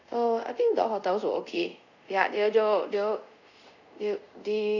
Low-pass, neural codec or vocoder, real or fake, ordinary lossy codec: 7.2 kHz; codec, 24 kHz, 0.5 kbps, DualCodec; fake; none